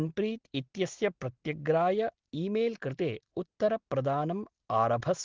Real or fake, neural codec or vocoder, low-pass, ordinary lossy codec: real; none; 7.2 kHz; Opus, 16 kbps